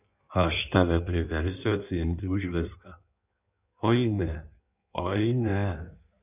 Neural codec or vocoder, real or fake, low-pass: codec, 16 kHz in and 24 kHz out, 1.1 kbps, FireRedTTS-2 codec; fake; 3.6 kHz